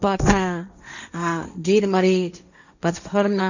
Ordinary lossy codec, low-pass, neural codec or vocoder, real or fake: none; 7.2 kHz; codec, 16 kHz, 1.1 kbps, Voila-Tokenizer; fake